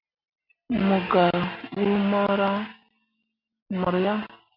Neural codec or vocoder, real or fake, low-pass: none; real; 5.4 kHz